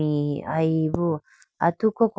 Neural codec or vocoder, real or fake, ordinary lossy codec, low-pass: none; real; none; none